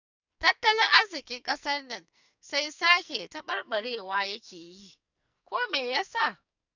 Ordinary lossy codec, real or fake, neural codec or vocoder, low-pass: none; fake; codec, 44.1 kHz, 2.6 kbps, SNAC; 7.2 kHz